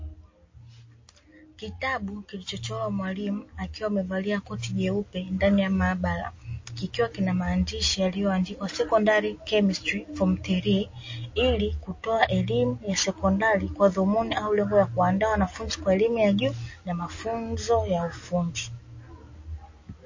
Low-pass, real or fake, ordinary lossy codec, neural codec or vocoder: 7.2 kHz; real; MP3, 32 kbps; none